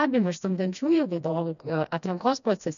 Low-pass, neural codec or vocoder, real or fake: 7.2 kHz; codec, 16 kHz, 1 kbps, FreqCodec, smaller model; fake